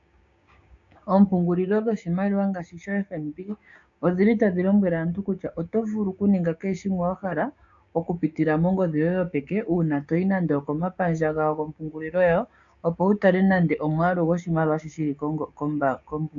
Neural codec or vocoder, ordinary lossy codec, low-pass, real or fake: codec, 16 kHz, 6 kbps, DAC; AAC, 64 kbps; 7.2 kHz; fake